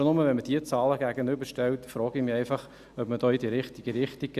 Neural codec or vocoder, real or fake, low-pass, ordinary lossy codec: none; real; 14.4 kHz; Opus, 64 kbps